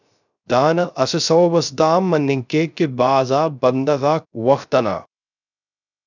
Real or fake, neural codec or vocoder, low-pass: fake; codec, 16 kHz, 0.3 kbps, FocalCodec; 7.2 kHz